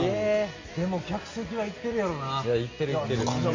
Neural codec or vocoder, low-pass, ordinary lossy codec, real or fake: none; 7.2 kHz; AAC, 32 kbps; real